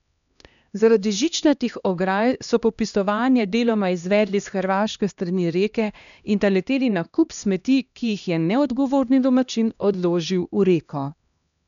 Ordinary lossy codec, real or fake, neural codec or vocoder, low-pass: none; fake; codec, 16 kHz, 1 kbps, X-Codec, HuBERT features, trained on LibriSpeech; 7.2 kHz